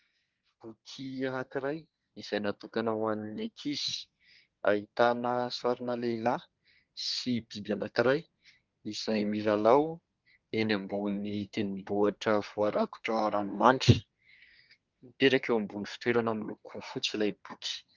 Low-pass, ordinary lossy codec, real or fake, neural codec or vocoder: 7.2 kHz; Opus, 32 kbps; fake; codec, 24 kHz, 1 kbps, SNAC